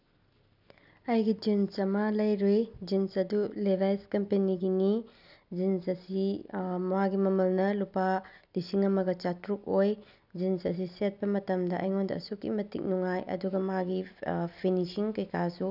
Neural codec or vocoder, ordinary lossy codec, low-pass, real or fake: none; none; 5.4 kHz; real